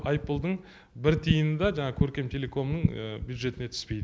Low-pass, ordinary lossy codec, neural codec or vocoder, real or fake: none; none; none; real